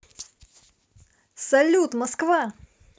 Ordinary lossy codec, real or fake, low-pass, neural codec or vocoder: none; real; none; none